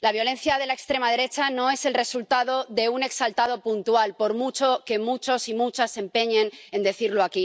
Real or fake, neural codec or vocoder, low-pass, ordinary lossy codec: real; none; none; none